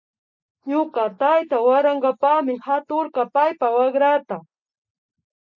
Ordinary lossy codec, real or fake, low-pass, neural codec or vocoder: MP3, 48 kbps; real; 7.2 kHz; none